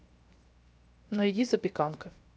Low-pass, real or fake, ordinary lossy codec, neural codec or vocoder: none; fake; none; codec, 16 kHz, 0.7 kbps, FocalCodec